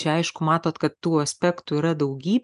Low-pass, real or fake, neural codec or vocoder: 10.8 kHz; real; none